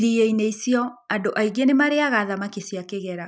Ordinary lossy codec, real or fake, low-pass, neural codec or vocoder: none; real; none; none